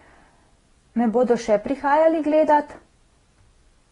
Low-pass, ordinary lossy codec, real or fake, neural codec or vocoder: 10.8 kHz; AAC, 32 kbps; fake; vocoder, 24 kHz, 100 mel bands, Vocos